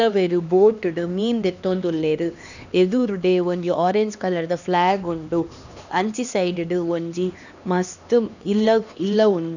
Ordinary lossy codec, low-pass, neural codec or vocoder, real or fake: none; 7.2 kHz; codec, 16 kHz, 2 kbps, X-Codec, HuBERT features, trained on LibriSpeech; fake